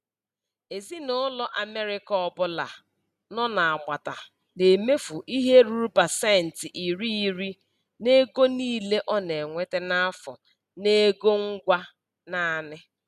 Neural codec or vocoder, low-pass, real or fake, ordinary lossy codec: none; 14.4 kHz; real; none